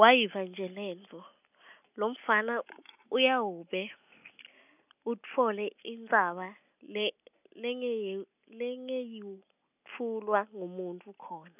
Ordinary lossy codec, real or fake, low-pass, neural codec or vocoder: none; real; 3.6 kHz; none